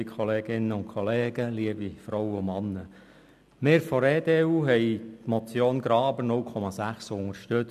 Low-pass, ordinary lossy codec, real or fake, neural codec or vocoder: 14.4 kHz; none; real; none